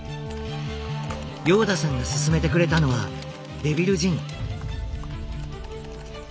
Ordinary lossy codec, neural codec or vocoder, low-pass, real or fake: none; none; none; real